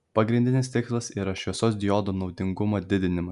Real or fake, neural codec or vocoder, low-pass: real; none; 10.8 kHz